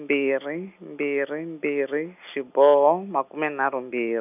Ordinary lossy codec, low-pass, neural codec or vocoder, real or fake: none; 3.6 kHz; none; real